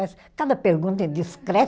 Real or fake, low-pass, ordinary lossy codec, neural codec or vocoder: real; none; none; none